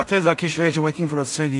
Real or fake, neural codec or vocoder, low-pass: fake; codec, 16 kHz in and 24 kHz out, 0.4 kbps, LongCat-Audio-Codec, two codebook decoder; 10.8 kHz